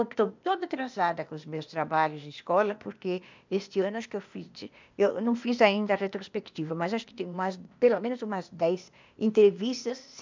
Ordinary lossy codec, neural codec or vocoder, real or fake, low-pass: MP3, 64 kbps; codec, 16 kHz, 0.8 kbps, ZipCodec; fake; 7.2 kHz